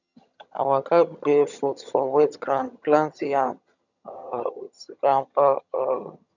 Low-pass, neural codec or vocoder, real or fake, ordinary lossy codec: 7.2 kHz; vocoder, 22.05 kHz, 80 mel bands, HiFi-GAN; fake; none